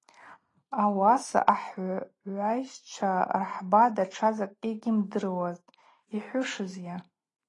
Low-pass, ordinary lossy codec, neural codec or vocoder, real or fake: 10.8 kHz; AAC, 32 kbps; none; real